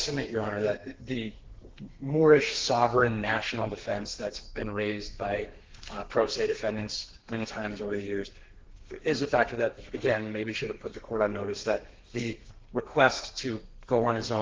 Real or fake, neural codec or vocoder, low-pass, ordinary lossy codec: fake; codec, 32 kHz, 1.9 kbps, SNAC; 7.2 kHz; Opus, 16 kbps